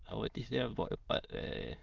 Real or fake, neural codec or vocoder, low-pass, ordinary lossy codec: fake; autoencoder, 22.05 kHz, a latent of 192 numbers a frame, VITS, trained on many speakers; 7.2 kHz; Opus, 24 kbps